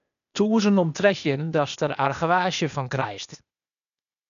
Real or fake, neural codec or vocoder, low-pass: fake; codec, 16 kHz, 0.8 kbps, ZipCodec; 7.2 kHz